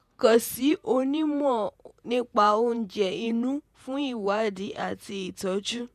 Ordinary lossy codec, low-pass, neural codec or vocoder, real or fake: none; 14.4 kHz; vocoder, 48 kHz, 128 mel bands, Vocos; fake